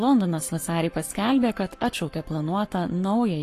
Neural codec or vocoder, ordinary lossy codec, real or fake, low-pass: codec, 44.1 kHz, 7.8 kbps, Pupu-Codec; AAC, 48 kbps; fake; 14.4 kHz